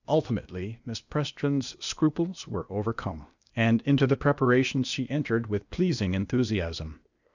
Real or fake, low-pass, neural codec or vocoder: fake; 7.2 kHz; codec, 16 kHz, 0.8 kbps, ZipCodec